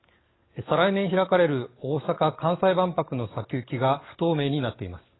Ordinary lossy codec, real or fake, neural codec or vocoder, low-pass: AAC, 16 kbps; fake; codec, 44.1 kHz, 7.8 kbps, DAC; 7.2 kHz